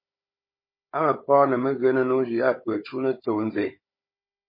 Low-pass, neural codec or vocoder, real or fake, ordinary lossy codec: 5.4 kHz; codec, 16 kHz, 16 kbps, FunCodec, trained on Chinese and English, 50 frames a second; fake; MP3, 24 kbps